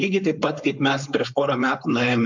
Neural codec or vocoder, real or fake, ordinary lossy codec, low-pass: codec, 16 kHz, 4.8 kbps, FACodec; fake; MP3, 64 kbps; 7.2 kHz